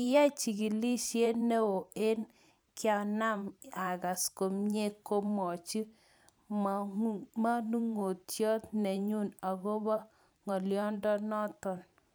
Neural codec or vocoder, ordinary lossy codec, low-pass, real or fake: vocoder, 44.1 kHz, 128 mel bands every 512 samples, BigVGAN v2; none; none; fake